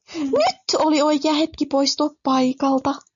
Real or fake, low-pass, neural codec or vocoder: real; 7.2 kHz; none